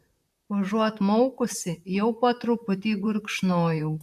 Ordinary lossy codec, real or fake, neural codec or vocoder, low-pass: AAC, 96 kbps; fake; vocoder, 44.1 kHz, 128 mel bands, Pupu-Vocoder; 14.4 kHz